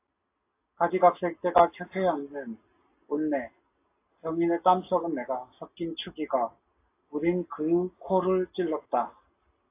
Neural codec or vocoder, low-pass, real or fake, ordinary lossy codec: none; 3.6 kHz; real; AAC, 24 kbps